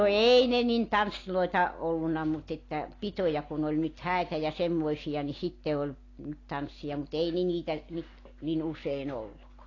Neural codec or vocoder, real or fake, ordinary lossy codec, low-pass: none; real; AAC, 32 kbps; 7.2 kHz